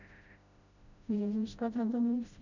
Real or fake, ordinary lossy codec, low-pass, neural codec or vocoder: fake; none; 7.2 kHz; codec, 16 kHz, 0.5 kbps, FreqCodec, smaller model